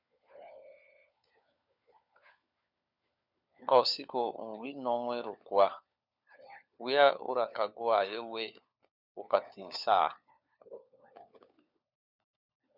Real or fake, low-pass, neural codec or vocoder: fake; 5.4 kHz; codec, 16 kHz, 4 kbps, FunCodec, trained on LibriTTS, 50 frames a second